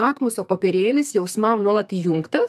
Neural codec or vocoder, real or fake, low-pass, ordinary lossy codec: codec, 32 kHz, 1.9 kbps, SNAC; fake; 14.4 kHz; AAC, 96 kbps